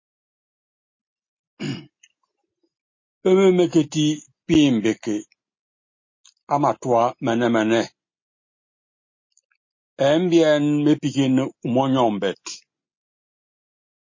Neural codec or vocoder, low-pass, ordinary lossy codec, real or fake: none; 7.2 kHz; MP3, 32 kbps; real